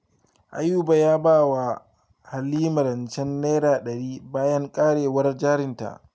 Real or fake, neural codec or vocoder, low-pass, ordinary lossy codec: real; none; none; none